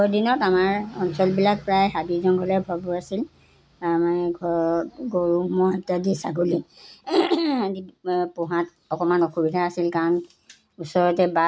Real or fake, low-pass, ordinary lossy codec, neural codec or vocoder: real; none; none; none